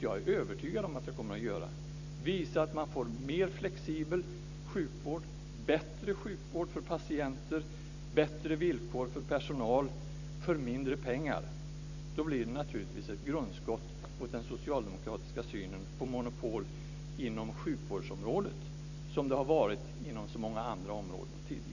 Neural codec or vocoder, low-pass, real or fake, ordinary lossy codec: none; 7.2 kHz; real; none